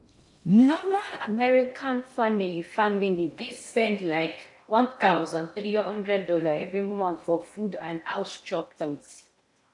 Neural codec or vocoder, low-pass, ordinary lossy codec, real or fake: codec, 16 kHz in and 24 kHz out, 0.6 kbps, FocalCodec, streaming, 2048 codes; 10.8 kHz; AAC, 64 kbps; fake